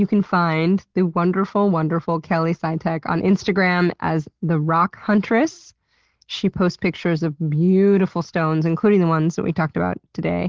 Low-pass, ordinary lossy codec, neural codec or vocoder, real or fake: 7.2 kHz; Opus, 16 kbps; none; real